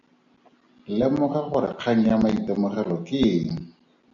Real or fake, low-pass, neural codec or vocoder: real; 7.2 kHz; none